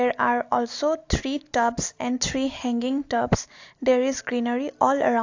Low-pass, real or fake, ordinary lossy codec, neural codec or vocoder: 7.2 kHz; real; AAC, 48 kbps; none